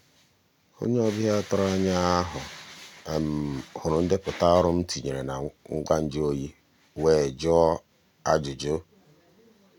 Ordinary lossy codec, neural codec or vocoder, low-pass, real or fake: none; none; 19.8 kHz; real